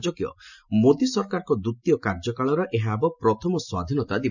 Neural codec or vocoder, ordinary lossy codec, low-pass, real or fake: none; none; 7.2 kHz; real